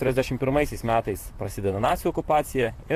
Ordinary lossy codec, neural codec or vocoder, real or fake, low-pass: AAC, 64 kbps; vocoder, 44.1 kHz, 128 mel bands, Pupu-Vocoder; fake; 14.4 kHz